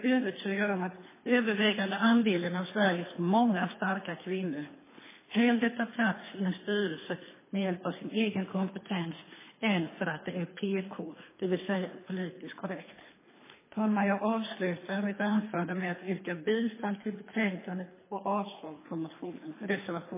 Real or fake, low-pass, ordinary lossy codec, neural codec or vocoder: fake; 3.6 kHz; MP3, 16 kbps; codec, 24 kHz, 3 kbps, HILCodec